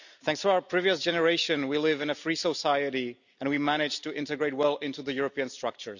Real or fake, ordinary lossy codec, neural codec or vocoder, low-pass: real; none; none; 7.2 kHz